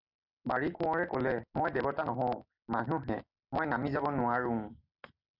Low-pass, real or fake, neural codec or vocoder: 5.4 kHz; real; none